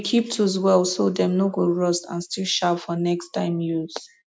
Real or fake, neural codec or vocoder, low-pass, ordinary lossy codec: real; none; none; none